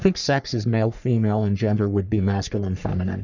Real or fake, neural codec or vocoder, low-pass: fake; codec, 44.1 kHz, 3.4 kbps, Pupu-Codec; 7.2 kHz